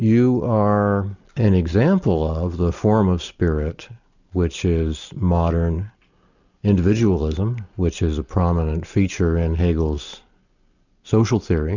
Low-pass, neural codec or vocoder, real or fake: 7.2 kHz; none; real